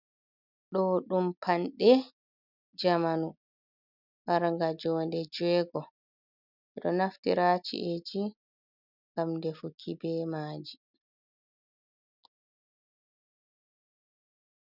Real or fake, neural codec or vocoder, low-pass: real; none; 5.4 kHz